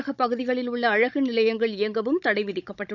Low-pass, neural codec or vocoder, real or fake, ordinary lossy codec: 7.2 kHz; codec, 16 kHz, 16 kbps, FunCodec, trained on Chinese and English, 50 frames a second; fake; none